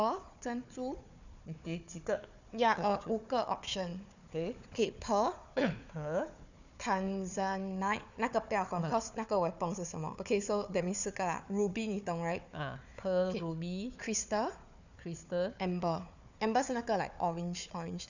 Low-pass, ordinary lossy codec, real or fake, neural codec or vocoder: 7.2 kHz; none; fake; codec, 16 kHz, 4 kbps, FunCodec, trained on Chinese and English, 50 frames a second